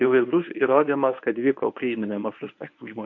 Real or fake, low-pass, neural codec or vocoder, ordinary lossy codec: fake; 7.2 kHz; codec, 24 kHz, 0.9 kbps, WavTokenizer, medium speech release version 1; MP3, 48 kbps